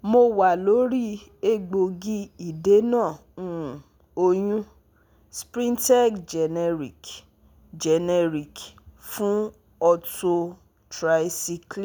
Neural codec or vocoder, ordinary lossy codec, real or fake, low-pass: none; none; real; none